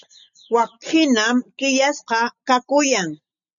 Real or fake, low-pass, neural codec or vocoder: real; 7.2 kHz; none